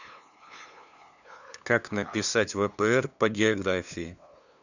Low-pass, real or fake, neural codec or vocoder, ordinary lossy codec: 7.2 kHz; fake; codec, 16 kHz, 2 kbps, FunCodec, trained on LibriTTS, 25 frames a second; none